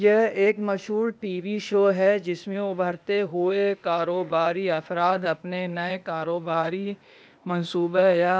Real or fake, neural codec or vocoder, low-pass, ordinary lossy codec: fake; codec, 16 kHz, 0.8 kbps, ZipCodec; none; none